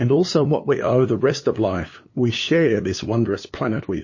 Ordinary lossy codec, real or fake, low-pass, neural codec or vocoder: MP3, 32 kbps; fake; 7.2 kHz; codec, 16 kHz, 2 kbps, FunCodec, trained on LibriTTS, 25 frames a second